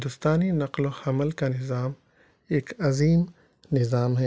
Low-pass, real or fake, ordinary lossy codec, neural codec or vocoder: none; real; none; none